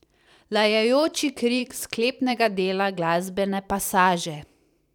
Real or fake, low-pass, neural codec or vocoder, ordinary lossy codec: fake; 19.8 kHz; vocoder, 44.1 kHz, 128 mel bands every 512 samples, BigVGAN v2; none